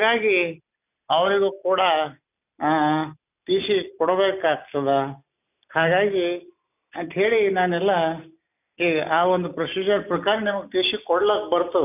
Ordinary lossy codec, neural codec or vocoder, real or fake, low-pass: none; none; real; 3.6 kHz